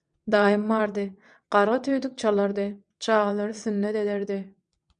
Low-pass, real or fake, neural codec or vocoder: 9.9 kHz; fake; vocoder, 22.05 kHz, 80 mel bands, WaveNeXt